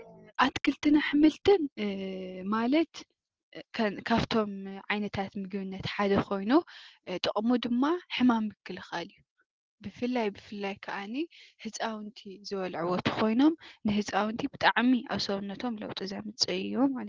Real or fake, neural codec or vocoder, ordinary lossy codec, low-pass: real; none; Opus, 16 kbps; 7.2 kHz